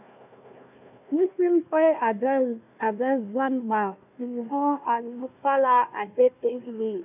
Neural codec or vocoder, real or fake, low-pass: codec, 16 kHz, 1 kbps, FunCodec, trained on Chinese and English, 50 frames a second; fake; 3.6 kHz